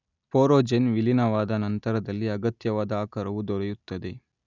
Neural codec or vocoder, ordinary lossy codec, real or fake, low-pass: none; none; real; 7.2 kHz